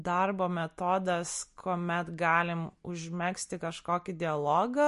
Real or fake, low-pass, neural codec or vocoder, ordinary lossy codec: real; 14.4 kHz; none; MP3, 48 kbps